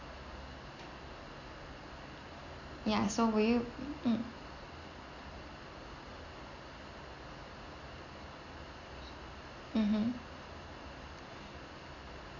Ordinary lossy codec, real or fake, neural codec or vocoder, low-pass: none; real; none; 7.2 kHz